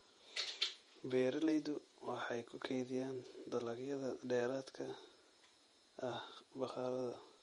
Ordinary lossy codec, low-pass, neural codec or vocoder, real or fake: MP3, 48 kbps; 19.8 kHz; vocoder, 48 kHz, 128 mel bands, Vocos; fake